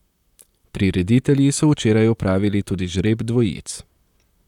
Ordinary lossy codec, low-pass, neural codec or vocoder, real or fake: none; 19.8 kHz; vocoder, 44.1 kHz, 128 mel bands, Pupu-Vocoder; fake